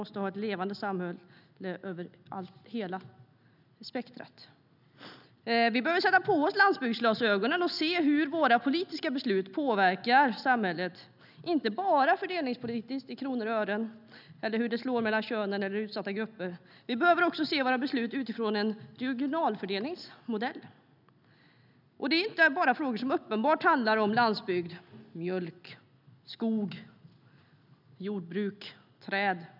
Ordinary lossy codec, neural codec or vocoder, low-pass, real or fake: none; none; 5.4 kHz; real